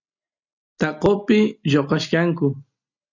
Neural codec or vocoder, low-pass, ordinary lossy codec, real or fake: none; 7.2 kHz; AAC, 48 kbps; real